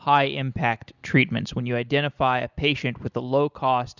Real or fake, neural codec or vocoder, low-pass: real; none; 7.2 kHz